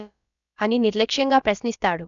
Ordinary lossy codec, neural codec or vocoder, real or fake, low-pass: none; codec, 16 kHz, about 1 kbps, DyCAST, with the encoder's durations; fake; 7.2 kHz